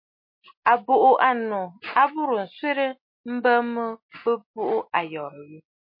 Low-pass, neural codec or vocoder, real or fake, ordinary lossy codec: 5.4 kHz; none; real; MP3, 32 kbps